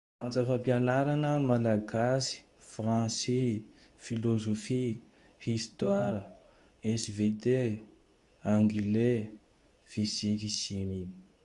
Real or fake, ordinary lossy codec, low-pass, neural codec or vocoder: fake; Opus, 64 kbps; 10.8 kHz; codec, 24 kHz, 0.9 kbps, WavTokenizer, medium speech release version 2